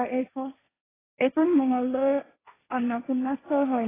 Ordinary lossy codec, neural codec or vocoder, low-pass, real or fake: AAC, 16 kbps; codec, 16 kHz, 1.1 kbps, Voila-Tokenizer; 3.6 kHz; fake